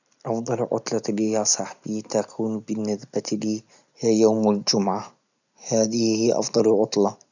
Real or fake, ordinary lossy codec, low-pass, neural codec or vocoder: real; none; 7.2 kHz; none